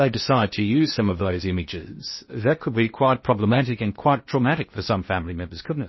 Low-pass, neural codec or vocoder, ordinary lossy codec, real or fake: 7.2 kHz; codec, 16 kHz in and 24 kHz out, 0.6 kbps, FocalCodec, streaming, 2048 codes; MP3, 24 kbps; fake